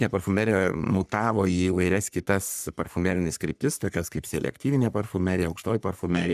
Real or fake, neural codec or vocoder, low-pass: fake; codec, 44.1 kHz, 3.4 kbps, Pupu-Codec; 14.4 kHz